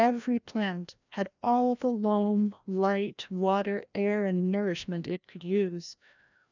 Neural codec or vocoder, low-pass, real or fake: codec, 16 kHz, 1 kbps, FreqCodec, larger model; 7.2 kHz; fake